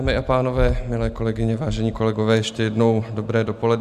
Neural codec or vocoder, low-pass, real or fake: none; 14.4 kHz; real